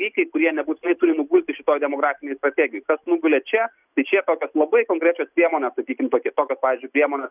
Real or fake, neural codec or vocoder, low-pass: real; none; 3.6 kHz